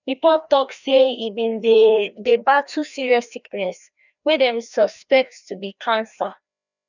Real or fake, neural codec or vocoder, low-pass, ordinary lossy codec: fake; codec, 16 kHz, 1 kbps, FreqCodec, larger model; 7.2 kHz; none